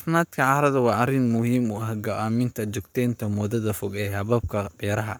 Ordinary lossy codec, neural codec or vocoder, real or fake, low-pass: none; codec, 44.1 kHz, 7.8 kbps, Pupu-Codec; fake; none